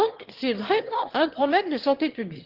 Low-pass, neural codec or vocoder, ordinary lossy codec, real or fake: 5.4 kHz; autoencoder, 22.05 kHz, a latent of 192 numbers a frame, VITS, trained on one speaker; Opus, 16 kbps; fake